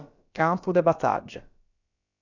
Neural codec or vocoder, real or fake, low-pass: codec, 16 kHz, about 1 kbps, DyCAST, with the encoder's durations; fake; 7.2 kHz